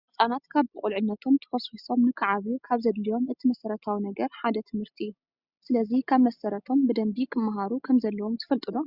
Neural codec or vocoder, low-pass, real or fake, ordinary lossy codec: none; 5.4 kHz; real; Opus, 64 kbps